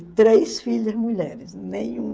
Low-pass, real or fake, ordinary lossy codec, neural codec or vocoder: none; fake; none; codec, 16 kHz, 16 kbps, FreqCodec, smaller model